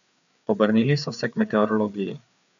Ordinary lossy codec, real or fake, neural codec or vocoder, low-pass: none; fake; codec, 16 kHz, 4 kbps, FreqCodec, larger model; 7.2 kHz